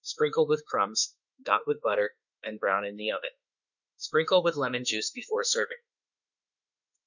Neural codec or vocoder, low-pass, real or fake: autoencoder, 48 kHz, 32 numbers a frame, DAC-VAE, trained on Japanese speech; 7.2 kHz; fake